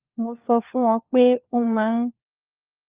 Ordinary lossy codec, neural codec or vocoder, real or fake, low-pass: Opus, 24 kbps; codec, 16 kHz, 4 kbps, FunCodec, trained on LibriTTS, 50 frames a second; fake; 3.6 kHz